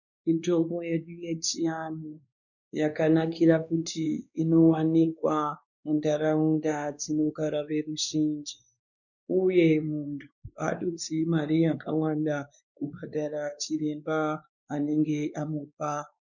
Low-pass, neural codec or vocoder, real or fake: 7.2 kHz; codec, 16 kHz, 2 kbps, X-Codec, WavLM features, trained on Multilingual LibriSpeech; fake